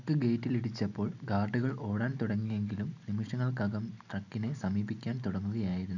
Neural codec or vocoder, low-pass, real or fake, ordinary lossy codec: none; 7.2 kHz; real; none